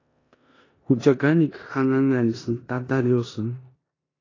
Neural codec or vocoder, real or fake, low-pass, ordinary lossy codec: codec, 16 kHz in and 24 kHz out, 0.9 kbps, LongCat-Audio-Codec, four codebook decoder; fake; 7.2 kHz; AAC, 32 kbps